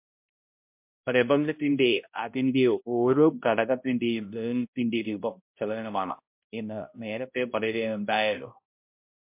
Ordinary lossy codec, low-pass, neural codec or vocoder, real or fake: MP3, 32 kbps; 3.6 kHz; codec, 16 kHz, 0.5 kbps, X-Codec, HuBERT features, trained on balanced general audio; fake